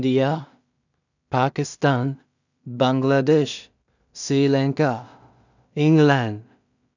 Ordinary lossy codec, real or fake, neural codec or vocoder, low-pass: none; fake; codec, 16 kHz in and 24 kHz out, 0.4 kbps, LongCat-Audio-Codec, two codebook decoder; 7.2 kHz